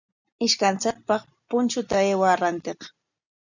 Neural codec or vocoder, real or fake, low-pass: none; real; 7.2 kHz